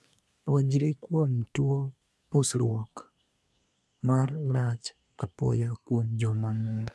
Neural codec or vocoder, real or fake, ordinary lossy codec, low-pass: codec, 24 kHz, 1 kbps, SNAC; fake; none; none